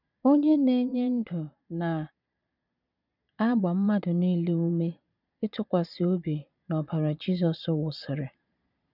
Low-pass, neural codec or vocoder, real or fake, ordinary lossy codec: 5.4 kHz; vocoder, 22.05 kHz, 80 mel bands, Vocos; fake; none